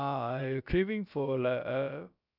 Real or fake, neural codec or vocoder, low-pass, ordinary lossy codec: fake; codec, 16 kHz, about 1 kbps, DyCAST, with the encoder's durations; 5.4 kHz; none